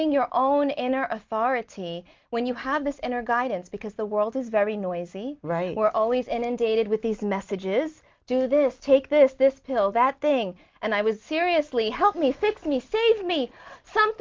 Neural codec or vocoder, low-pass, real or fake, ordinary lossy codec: none; 7.2 kHz; real; Opus, 24 kbps